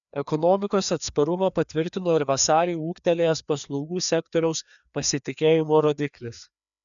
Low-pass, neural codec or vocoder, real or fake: 7.2 kHz; codec, 16 kHz, 2 kbps, FreqCodec, larger model; fake